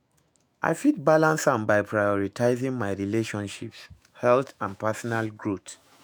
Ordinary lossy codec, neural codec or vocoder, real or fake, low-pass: none; autoencoder, 48 kHz, 128 numbers a frame, DAC-VAE, trained on Japanese speech; fake; none